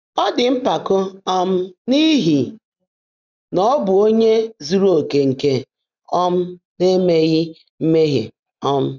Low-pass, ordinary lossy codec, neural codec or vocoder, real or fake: 7.2 kHz; none; none; real